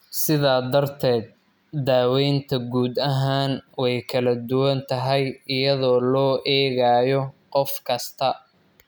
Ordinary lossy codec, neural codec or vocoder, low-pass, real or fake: none; none; none; real